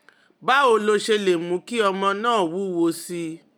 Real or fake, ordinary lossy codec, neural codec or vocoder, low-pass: real; none; none; 19.8 kHz